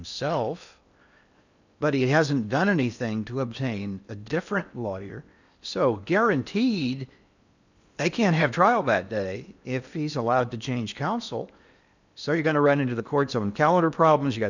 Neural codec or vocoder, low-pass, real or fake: codec, 16 kHz in and 24 kHz out, 0.8 kbps, FocalCodec, streaming, 65536 codes; 7.2 kHz; fake